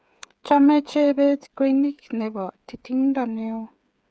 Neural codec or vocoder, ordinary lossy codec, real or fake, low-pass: codec, 16 kHz, 8 kbps, FreqCodec, smaller model; none; fake; none